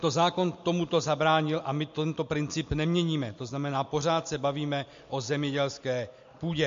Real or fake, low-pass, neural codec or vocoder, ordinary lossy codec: real; 7.2 kHz; none; MP3, 48 kbps